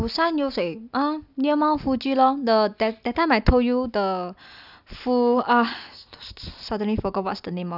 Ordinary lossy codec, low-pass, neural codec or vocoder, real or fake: none; 5.4 kHz; none; real